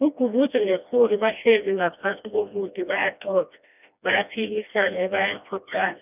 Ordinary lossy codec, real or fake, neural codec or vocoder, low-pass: none; fake; codec, 16 kHz, 1 kbps, FreqCodec, smaller model; 3.6 kHz